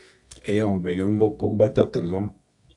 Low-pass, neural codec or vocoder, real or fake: 10.8 kHz; codec, 24 kHz, 0.9 kbps, WavTokenizer, medium music audio release; fake